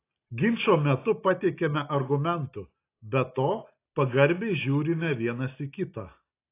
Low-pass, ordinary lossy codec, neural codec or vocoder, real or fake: 3.6 kHz; AAC, 24 kbps; none; real